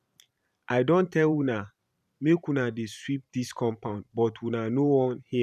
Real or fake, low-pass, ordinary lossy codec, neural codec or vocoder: fake; 14.4 kHz; none; vocoder, 44.1 kHz, 128 mel bands every 512 samples, BigVGAN v2